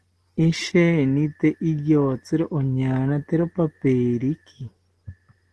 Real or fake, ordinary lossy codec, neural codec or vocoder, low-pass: real; Opus, 16 kbps; none; 10.8 kHz